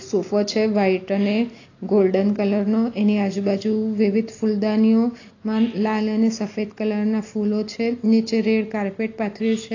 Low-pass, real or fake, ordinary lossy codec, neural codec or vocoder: 7.2 kHz; real; AAC, 32 kbps; none